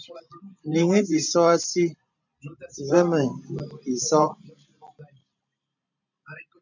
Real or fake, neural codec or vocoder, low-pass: fake; vocoder, 44.1 kHz, 128 mel bands every 512 samples, BigVGAN v2; 7.2 kHz